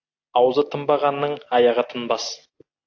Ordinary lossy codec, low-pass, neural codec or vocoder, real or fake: AAC, 48 kbps; 7.2 kHz; none; real